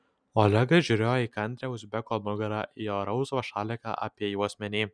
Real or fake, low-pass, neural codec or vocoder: real; 9.9 kHz; none